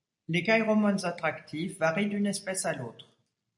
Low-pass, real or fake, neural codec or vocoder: 10.8 kHz; real; none